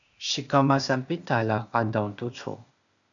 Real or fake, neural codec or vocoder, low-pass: fake; codec, 16 kHz, 0.8 kbps, ZipCodec; 7.2 kHz